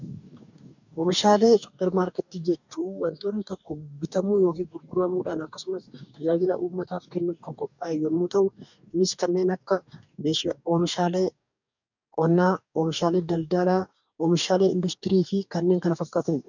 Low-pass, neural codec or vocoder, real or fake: 7.2 kHz; codec, 44.1 kHz, 2.6 kbps, DAC; fake